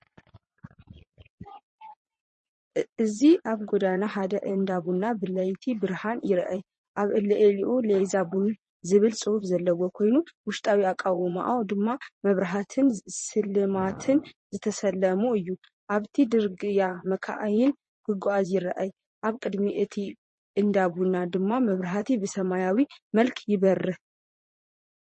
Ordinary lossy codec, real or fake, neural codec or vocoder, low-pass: MP3, 32 kbps; fake; vocoder, 22.05 kHz, 80 mel bands, Vocos; 9.9 kHz